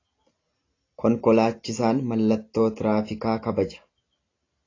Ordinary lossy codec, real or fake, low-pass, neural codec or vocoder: AAC, 32 kbps; real; 7.2 kHz; none